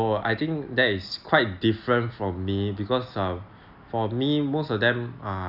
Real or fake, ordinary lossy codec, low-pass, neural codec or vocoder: real; none; 5.4 kHz; none